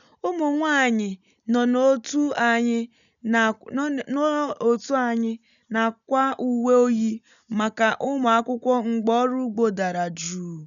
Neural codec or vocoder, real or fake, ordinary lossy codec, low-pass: none; real; none; 7.2 kHz